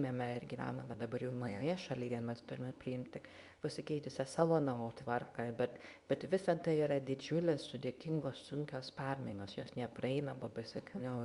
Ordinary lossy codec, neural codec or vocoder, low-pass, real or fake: Opus, 64 kbps; codec, 24 kHz, 0.9 kbps, WavTokenizer, medium speech release version 2; 10.8 kHz; fake